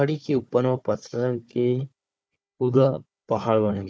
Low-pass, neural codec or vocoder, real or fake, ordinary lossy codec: none; codec, 16 kHz, 4 kbps, FunCodec, trained on Chinese and English, 50 frames a second; fake; none